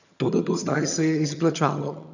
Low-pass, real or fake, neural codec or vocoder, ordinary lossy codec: 7.2 kHz; fake; vocoder, 22.05 kHz, 80 mel bands, HiFi-GAN; none